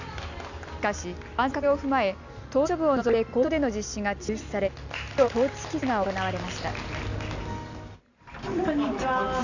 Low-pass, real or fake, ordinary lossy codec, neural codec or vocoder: 7.2 kHz; real; none; none